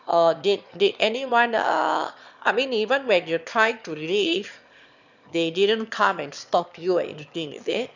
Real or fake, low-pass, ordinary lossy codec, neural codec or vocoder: fake; 7.2 kHz; none; autoencoder, 22.05 kHz, a latent of 192 numbers a frame, VITS, trained on one speaker